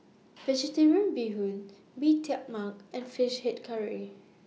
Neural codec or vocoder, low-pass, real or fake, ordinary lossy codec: none; none; real; none